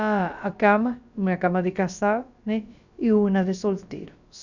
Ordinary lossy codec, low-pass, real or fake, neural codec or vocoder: none; 7.2 kHz; fake; codec, 16 kHz, about 1 kbps, DyCAST, with the encoder's durations